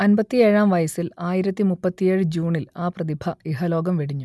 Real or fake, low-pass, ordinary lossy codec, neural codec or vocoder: real; none; none; none